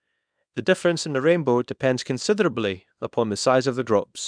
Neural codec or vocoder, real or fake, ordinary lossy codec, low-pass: codec, 24 kHz, 0.9 kbps, WavTokenizer, small release; fake; none; 9.9 kHz